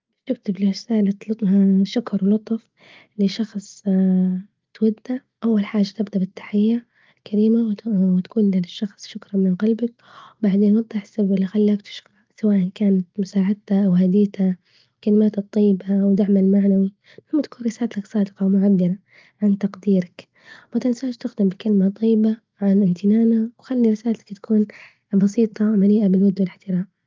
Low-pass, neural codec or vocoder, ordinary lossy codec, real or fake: 7.2 kHz; none; Opus, 24 kbps; real